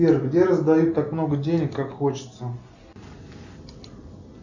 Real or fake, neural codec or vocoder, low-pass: real; none; 7.2 kHz